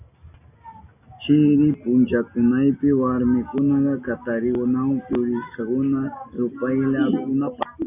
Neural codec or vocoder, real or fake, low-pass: none; real; 3.6 kHz